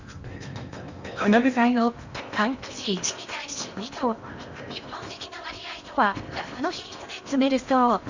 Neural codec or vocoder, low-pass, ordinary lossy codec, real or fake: codec, 16 kHz in and 24 kHz out, 0.8 kbps, FocalCodec, streaming, 65536 codes; 7.2 kHz; Opus, 64 kbps; fake